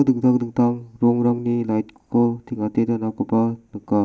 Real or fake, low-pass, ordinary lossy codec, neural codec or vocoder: real; none; none; none